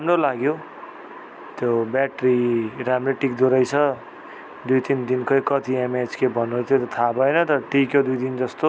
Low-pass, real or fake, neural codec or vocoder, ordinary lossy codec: none; real; none; none